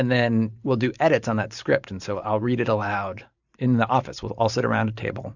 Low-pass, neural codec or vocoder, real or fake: 7.2 kHz; vocoder, 44.1 kHz, 128 mel bands every 512 samples, BigVGAN v2; fake